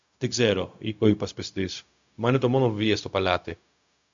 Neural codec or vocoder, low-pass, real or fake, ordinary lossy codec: codec, 16 kHz, 0.4 kbps, LongCat-Audio-Codec; 7.2 kHz; fake; MP3, 64 kbps